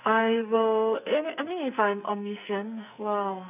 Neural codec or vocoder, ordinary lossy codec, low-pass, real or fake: codec, 44.1 kHz, 2.6 kbps, SNAC; none; 3.6 kHz; fake